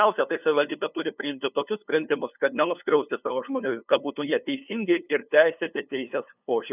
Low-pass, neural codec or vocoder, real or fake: 3.6 kHz; codec, 16 kHz, 2 kbps, FunCodec, trained on LibriTTS, 25 frames a second; fake